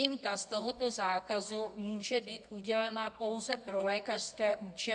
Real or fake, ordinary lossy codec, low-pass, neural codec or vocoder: fake; MP3, 64 kbps; 10.8 kHz; codec, 24 kHz, 0.9 kbps, WavTokenizer, medium music audio release